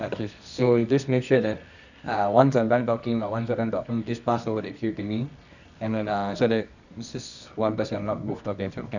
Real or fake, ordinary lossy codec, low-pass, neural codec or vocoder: fake; none; 7.2 kHz; codec, 24 kHz, 0.9 kbps, WavTokenizer, medium music audio release